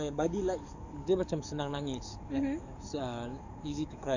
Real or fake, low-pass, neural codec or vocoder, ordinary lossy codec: fake; 7.2 kHz; codec, 44.1 kHz, 7.8 kbps, DAC; none